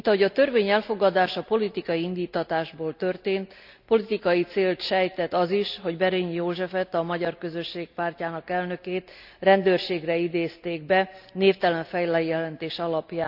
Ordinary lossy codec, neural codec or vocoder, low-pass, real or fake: none; none; 5.4 kHz; real